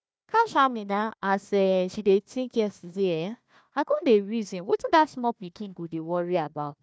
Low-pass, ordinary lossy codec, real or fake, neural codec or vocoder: none; none; fake; codec, 16 kHz, 1 kbps, FunCodec, trained on Chinese and English, 50 frames a second